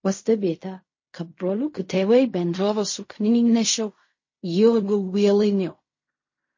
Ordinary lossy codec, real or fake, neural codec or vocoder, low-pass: MP3, 32 kbps; fake; codec, 16 kHz in and 24 kHz out, 0.4 kbps, LongCat-Audio-Codec, fine tuned four codebook decoder; 7.2 kHz